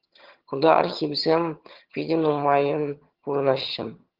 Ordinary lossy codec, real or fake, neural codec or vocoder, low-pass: Opus, 16 kbps; fake; vocoder, 22.05 kHz, 80 mel bands, HiFi-GAN; 5.4 kHz